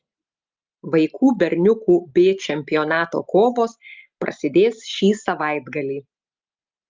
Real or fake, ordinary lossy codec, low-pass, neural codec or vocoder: real; Opus, 24 kbps; 7.2 kHz; none